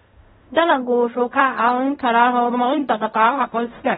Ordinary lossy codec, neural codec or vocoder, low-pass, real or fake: AAC, 16 kbps; codec, 16 kHz in and 24 kHz out, 0.4 kbps, LongCat-Audio-Codec, fine tuned four codebook decoder; 10.8 kHz; fake